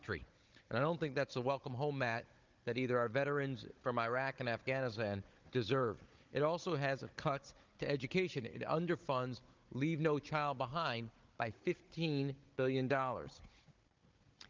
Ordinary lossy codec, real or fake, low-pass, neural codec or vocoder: Opus, 24 kbps; fake; 7.2 kHz; codec, 16 kHz, 16 kbps, FunCodec, trained on Chinese and English, 50 frames a second